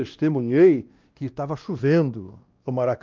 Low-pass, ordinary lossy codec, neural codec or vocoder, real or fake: 7.2 kHz; Opus, 32 kbps; codec, 16 kHz, 1 kbps, X-Codec, WavLM features, trained on Multilingual LibriSpeech; fake